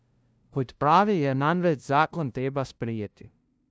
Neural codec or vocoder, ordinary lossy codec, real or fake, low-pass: codec, 16 kHz, 0.5 kbps, FunCodec, trained on LibriTTS, 25 frames a second; none; fake; none